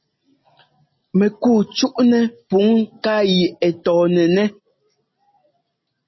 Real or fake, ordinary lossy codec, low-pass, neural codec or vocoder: real; MP3, 24 kbps; 7.2 kHz; none